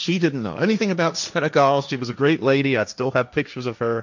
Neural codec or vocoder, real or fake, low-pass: codec, 16 kHz, 1.1 kbps, Voila-Tokenizer; fake; 7.2 kHz